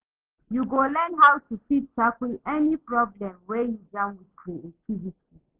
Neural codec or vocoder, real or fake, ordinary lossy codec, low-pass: none; real; Opus, 16 kbps; 3.6 kHz